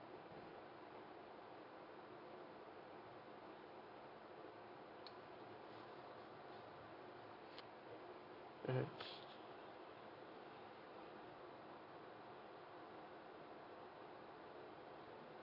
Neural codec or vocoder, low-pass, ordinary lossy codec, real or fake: none; 5.4 kHz; none; real